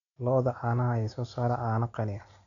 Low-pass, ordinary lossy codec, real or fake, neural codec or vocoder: 7.2 kHz; none; real; none